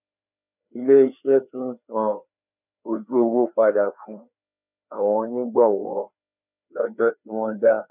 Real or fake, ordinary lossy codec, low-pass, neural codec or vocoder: fake; none; 3.6 kHz; codec, 16 kHz, 2 kbps, FreqCodec, larger model